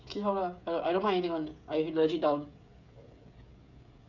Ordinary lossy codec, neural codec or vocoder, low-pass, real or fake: none; codec, 16 kHz, 16 kbps, FreqCodec, smaller model; 7.2 kHz; fake